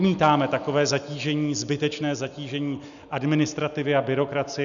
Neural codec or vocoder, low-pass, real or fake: none; 7.2 kHz; real